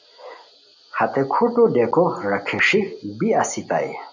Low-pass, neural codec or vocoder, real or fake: 7.2 kHz; none; real